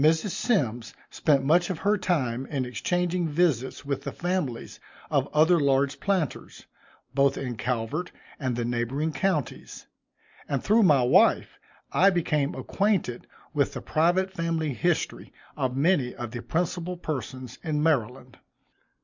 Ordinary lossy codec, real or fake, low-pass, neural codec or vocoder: MP3, 64 kbps; real; 7.2 kHz; none